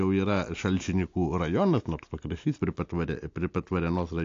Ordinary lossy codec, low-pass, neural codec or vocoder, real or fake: AAC, 48 kbps; 7.2 kHz; none; real